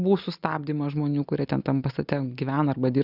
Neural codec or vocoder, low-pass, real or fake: none; 5.4 kHz; real